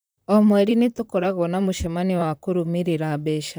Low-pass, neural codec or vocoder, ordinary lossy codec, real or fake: none; vocoder, 44.1 kHz, 128 mel bands, Pupu-Vocoder; none; fake